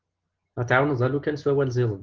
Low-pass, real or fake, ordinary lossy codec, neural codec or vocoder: 7.2 kHz; real; Opus, 16 kbps; none